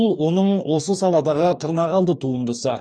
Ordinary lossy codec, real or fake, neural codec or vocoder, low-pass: none; fake; codec, 44.1 kHz, 2.6 kbps, DAC; 9.9 kHz